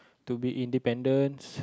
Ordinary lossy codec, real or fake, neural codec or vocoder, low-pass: none; real; none; none